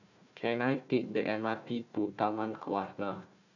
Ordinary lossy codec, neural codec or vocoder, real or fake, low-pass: none; codec, 16 kHz, 1 kbps, FunCodec, trained on Chinese and English, 50 frames a second; fake; 7.2 kHz